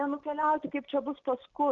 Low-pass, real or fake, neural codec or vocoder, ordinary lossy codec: 7.2 kHz; real; none; Opus, 24 kbps